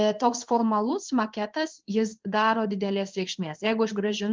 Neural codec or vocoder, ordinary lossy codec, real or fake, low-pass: codec, 16 kHz in and 24 kHz out, 1 kbps, XY-Tokenizer; Opus, 32 kbps; fake; 7.2 kHz